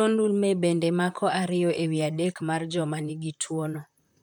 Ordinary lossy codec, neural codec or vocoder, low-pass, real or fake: none; vocoder, 44.1 kHz, 128 mel bands, Pupu-Vocoder; 19.8 kHz; fake